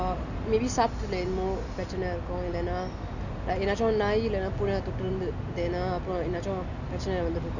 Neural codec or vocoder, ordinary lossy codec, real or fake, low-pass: none; none; real; 7.2 kHz